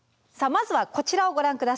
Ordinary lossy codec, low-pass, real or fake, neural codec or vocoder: none; none; real; none